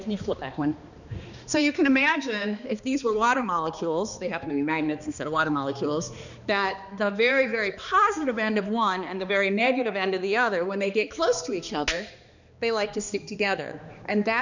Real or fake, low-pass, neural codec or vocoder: fake; 7.2 kHz; codec, 16 kHz, 2 kbps, X-Codec, HuBERT features, trained on balanced general audio